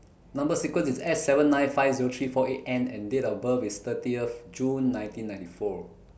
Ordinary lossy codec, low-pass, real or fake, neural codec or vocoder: none; none; real; none